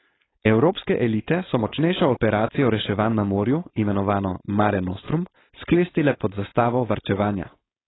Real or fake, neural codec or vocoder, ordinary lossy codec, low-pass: fake; codec, 16 kHz, 4.8 kbps, FACodec; AAC, 16 kbps; 7.2 kHz